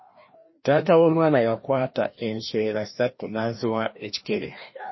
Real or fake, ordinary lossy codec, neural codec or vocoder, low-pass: fake; MP3, 24 kbps; codec, 16 kHz, 1 kbps, FreqCodec, larger model; 7.2 kHz